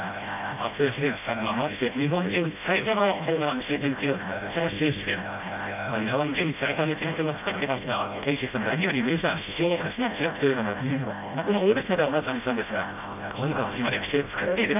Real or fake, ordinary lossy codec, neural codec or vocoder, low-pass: fake; none; codec, 16 kHz, 0.5 kbps, FreqCodec, smaller model; 3.6 kHz